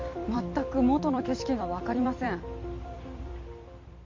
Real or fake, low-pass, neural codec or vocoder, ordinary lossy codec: fake; 7.2 kHz; vocoder, 44.1 kHz, 128 mel bands every 256 samples, BigVGAN v2; MP3, 64 kbps